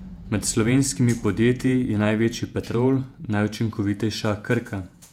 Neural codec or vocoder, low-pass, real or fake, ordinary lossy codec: vocoder, 48 kHz, 128 mel bands, Vocos; 19.8 kHz; fake; MP3, 96 kbps